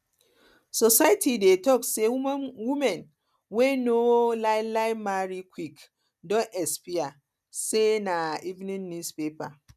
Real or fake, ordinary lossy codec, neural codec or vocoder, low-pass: real; none; none; 14.4 kHz